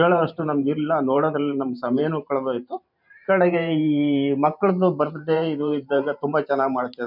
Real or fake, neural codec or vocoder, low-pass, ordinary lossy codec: fake; vocoder, 44.1 kHz, 128 mel bands every 512 samples, BigVGAN v2; 5.4 kHz; none